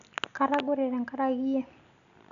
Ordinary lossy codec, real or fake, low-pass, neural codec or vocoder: none; real; 7.2 kHz; none